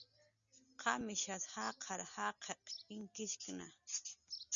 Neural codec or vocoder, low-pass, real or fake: none; 7.2 kHz; real